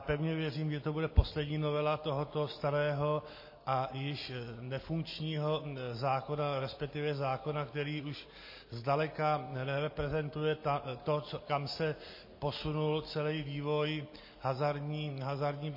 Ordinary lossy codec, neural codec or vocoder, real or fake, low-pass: MP3, 24 kbps; none; real; 5.4 kHz